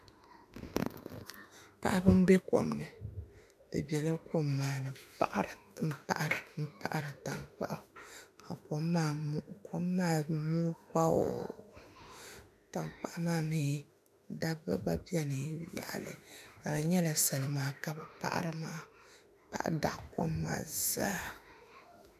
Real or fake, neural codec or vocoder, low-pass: fake; autoencoder, 48 kHz, 32 numbers a frame, DAC-VAE, trained on Japanese speech; 14.4 kHz